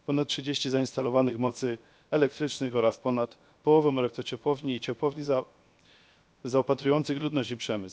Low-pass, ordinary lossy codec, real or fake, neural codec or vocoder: none; none; fake; codec, 16 kHz, 0.7 kbps, FocalCodec